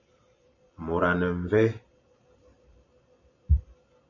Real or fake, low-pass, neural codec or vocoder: fake; 7.2 kHz; vocoder, 44.1 kHz, 128 mel bands every 512 samples, BigVGAN v2